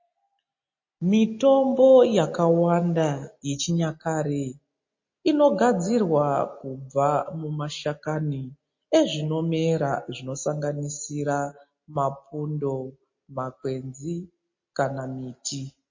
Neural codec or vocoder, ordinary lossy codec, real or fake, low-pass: none; MP3, 32 kbps; real; 7.2 kHz